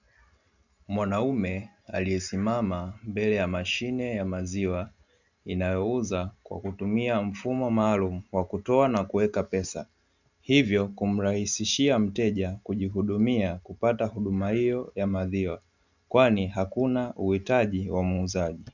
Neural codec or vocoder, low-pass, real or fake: none; 7.2 kHz; real